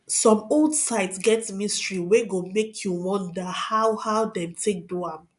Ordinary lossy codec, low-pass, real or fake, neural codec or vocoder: none; 10.8 kHz; real; none